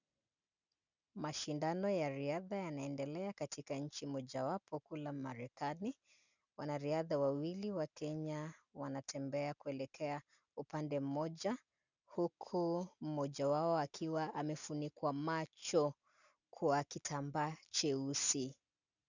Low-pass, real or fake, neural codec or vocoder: 7.2 kHz; real; none